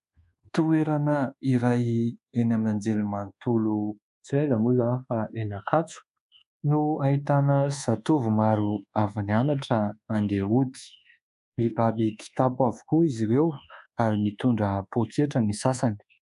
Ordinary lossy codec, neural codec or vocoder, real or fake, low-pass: MP3, 96 kbps; codec, 24 kHz, 1.2 kbps, DualCodec; fake; 10.8 kHz